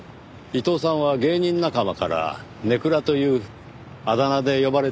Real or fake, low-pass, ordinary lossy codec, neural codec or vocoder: real; none; none; none